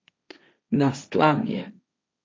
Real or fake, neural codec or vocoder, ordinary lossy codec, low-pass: fake; codec, 16 kHz, 1.1 kbps, Voila-Tokenizer; none; none